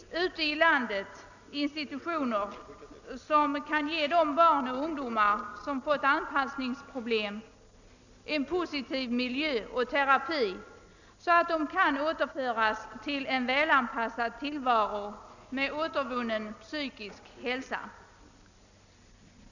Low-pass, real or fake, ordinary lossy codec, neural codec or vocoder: 7.2 kHz; real; none; none